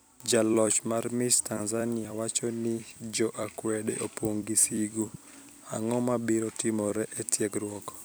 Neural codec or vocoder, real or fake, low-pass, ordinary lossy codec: vocoder, 44.1 kHz, 128 mel bands every 256 samples, BigVGAN v2; fake; none; none